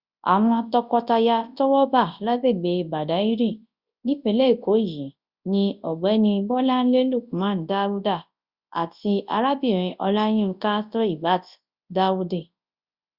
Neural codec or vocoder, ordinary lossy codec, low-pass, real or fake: codec, 24 kHz, 0.9 kbps, WavTokenizer, large speech release; Opus, 64 kbps; 5.4 kHz; fake